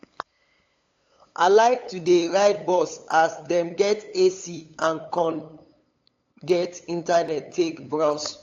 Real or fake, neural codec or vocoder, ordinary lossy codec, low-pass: fake; codec, 16 kHz, 8 kbps, FunCodec, trained on LibriTTS, 25 frames a second; AAC, 48 kbps; 7.2 kHz